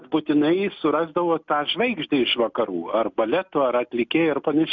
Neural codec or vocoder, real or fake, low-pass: none; real; 7.2 kHz